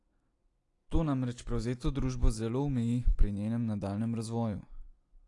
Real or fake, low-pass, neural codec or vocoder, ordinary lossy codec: real; 10.8 kHz; none; AAC, 48 kbps